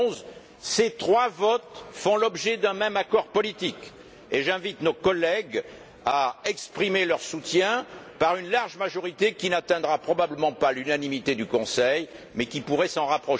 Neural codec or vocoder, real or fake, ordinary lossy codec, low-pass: none; real; none; none